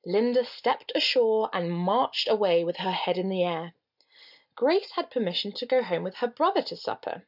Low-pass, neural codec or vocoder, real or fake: 5.4 kHz; none; real